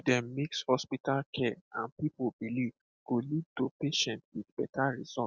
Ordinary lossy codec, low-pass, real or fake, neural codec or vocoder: none; none; real; none